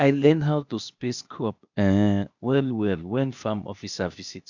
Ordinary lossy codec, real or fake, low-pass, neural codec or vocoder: none; fake; 7.2 kHz; codec, 16 kHz, 0.8 kbps, ZipCodec